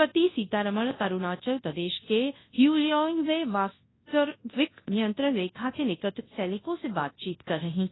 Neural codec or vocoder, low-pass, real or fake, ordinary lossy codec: codec, 24 kHz, 0.9 kbps, WavTokenizer, large speech release; 7.2 kHz; fake; AAC, 16 kbps